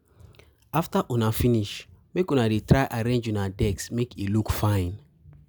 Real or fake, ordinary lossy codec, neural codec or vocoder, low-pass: real; none; none; none